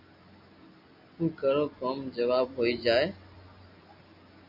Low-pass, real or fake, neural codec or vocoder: 5.4 kHz; real; none